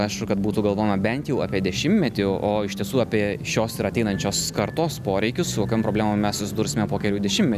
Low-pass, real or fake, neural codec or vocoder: 14.4 kHz; real; none